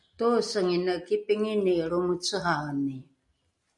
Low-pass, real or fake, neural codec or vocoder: 10.8 kHz; real; none